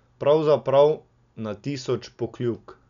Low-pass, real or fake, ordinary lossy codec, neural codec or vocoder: 7.2 kHz; real; none; none